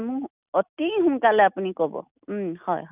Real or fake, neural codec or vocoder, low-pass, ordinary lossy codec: real; none; 3.6 kHz; none